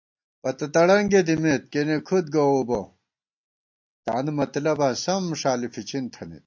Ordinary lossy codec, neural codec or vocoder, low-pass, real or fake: MP3, 48 kbps; none; 7.2 kHz; real